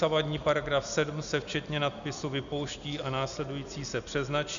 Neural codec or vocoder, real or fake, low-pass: none; real; 7.2 kHz